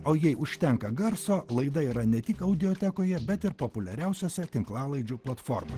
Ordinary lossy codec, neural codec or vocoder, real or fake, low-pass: Opus, 16 kbps; none; real; 14.4 kHz